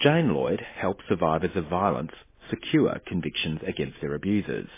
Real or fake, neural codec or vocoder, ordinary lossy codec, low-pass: real; none; MP3, 16 kbps; 3.6 kHz